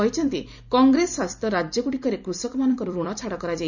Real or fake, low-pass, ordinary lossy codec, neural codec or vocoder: fake; 7.2 kHz; none; vocoder, 44.1 kHz, 128 mel bands every 256 samples, BigVGAN v2